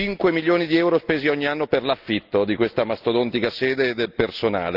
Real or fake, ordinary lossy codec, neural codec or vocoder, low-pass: real; Opus, 32 kbps; none; 5.4 kHz